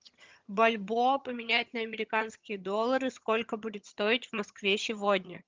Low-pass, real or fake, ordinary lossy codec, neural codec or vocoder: 7.2 kHz; fake; Opus, 24 kbps; vocoder, 22.05 kHz, 80 mel bands, HiFi-GAN